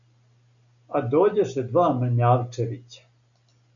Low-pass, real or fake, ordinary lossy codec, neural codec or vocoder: 7.2 kHz; real; MP3, 96 kbps; none